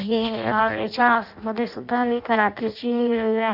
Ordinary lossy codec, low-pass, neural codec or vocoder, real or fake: none; 5.4 kHz; codec, 16 kHz in and 24 kHz out, 0.6 kbps, FireRedTTS-2 codec; fake